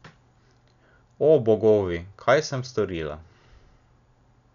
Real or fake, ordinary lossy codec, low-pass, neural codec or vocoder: real; none; 7.2 kHz; none